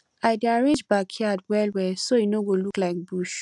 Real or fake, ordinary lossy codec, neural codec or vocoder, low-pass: real; none; none; 10.8 kHz